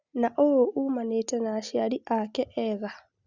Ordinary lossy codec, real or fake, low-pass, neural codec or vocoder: Opus, 64 kbps; real; 7.2 kHz; none